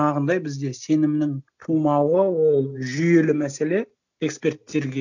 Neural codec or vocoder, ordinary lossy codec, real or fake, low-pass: none; none; real; 7.2 kHz